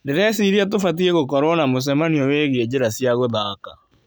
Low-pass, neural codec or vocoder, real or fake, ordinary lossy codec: none; none; real; none